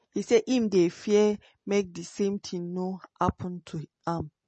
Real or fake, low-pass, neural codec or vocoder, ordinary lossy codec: real; 10.8 kHz; none; MP3, 32 kbps